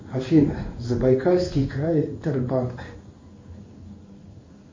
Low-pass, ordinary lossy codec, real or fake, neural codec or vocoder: 7.2 kHz; MP3, 32 kbps; fake; codec, 16 kHz in and 24 kHz out, 1 kbps, XY-Tokenizer